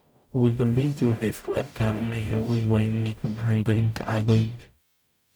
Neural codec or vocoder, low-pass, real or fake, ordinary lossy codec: codec, 44.1 kHz, 0.9 kbps, DAC; none; fake; none